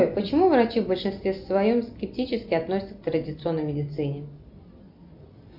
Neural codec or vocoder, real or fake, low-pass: none; real; 5.4 kHz